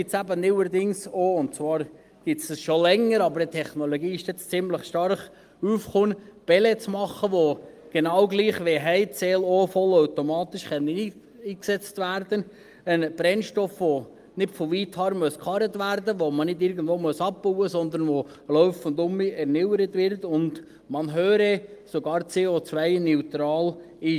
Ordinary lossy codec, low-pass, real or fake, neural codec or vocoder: Opus, 32 kbps; 14.4 kHz; real; none